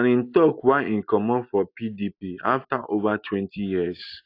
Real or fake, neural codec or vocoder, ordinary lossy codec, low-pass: real; none; AAC, 32 kbps; 5.4 kHz